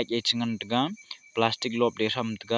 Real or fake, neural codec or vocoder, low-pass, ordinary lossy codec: real; none; none; none